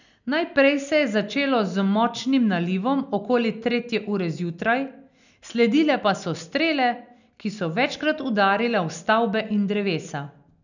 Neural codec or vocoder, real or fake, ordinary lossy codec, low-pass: none; real; none; 7.2 kHz